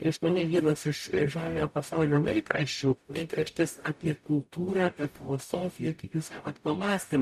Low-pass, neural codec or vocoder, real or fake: 14.4 kHz; codec, 44.1 kHz, 0.9 kbps, DAC; fake